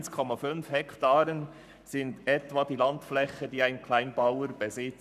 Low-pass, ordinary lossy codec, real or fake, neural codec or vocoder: 14.4 kHz; none; fake; codec, 44.1 kHz, 7.8 kbps, Pupu-Codec